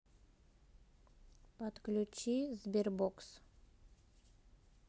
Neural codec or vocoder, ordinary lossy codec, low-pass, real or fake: none; none; none; real